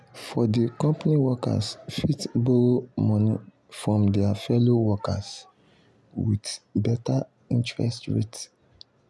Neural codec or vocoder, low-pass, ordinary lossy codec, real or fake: none; none; none; real